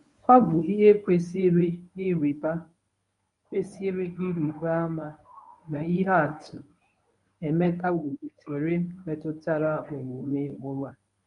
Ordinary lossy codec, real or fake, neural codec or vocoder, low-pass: none; fake; codec, 24 kHz, 0.9 kbps, WavTokenizer, medium speech release version 1; 10.8 kHz